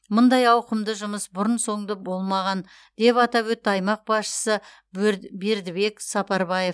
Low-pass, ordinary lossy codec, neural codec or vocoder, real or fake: none; none; none; real